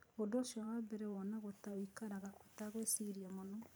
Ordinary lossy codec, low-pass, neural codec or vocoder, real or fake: none; none; vocoder, 44.1 kHz, 128 mel bands every 512 samples, BigVGAN v2; fake